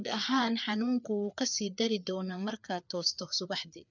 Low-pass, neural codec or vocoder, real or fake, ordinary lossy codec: 7.2 kHz; codec, 16 kHz, 4 kbps, FreqCodec, larger model; fake; none